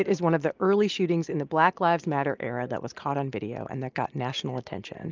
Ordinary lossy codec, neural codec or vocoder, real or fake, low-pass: Opus, 24 kbps; none; real; 7.2 kHz